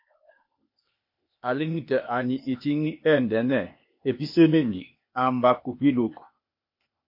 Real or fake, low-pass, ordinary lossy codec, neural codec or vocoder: fake; 5.4 kHz; MP3, 32 kbps; codec, 16 kHz, 0.8 kbps, ZipCodec